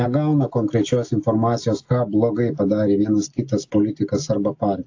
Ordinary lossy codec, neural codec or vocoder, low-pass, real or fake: AAC, 48 kbps; none; 7.2 kHz; real